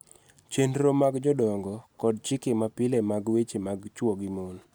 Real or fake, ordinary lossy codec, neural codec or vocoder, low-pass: real; none; none; none